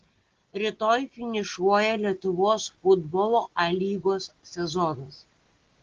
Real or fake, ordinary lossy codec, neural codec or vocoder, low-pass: real; Opus, 16 kbps; none; 7.2 kHz